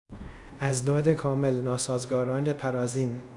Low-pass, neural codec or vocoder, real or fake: 10.8 kHz; codec, 24 kHz, 0.5 kbps, DualCodec; fake